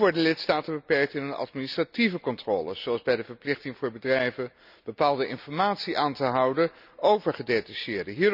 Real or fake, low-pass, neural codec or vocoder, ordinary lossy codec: real; 5.4 kHz; none; none